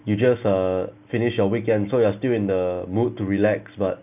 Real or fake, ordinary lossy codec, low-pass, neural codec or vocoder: real; none; 3.6 kHz; none